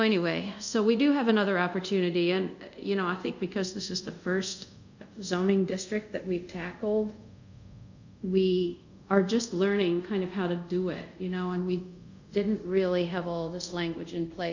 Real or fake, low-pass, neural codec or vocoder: fake; 7.2 kHz; codec, 24 kHz, 0.5 kbps, DualCodec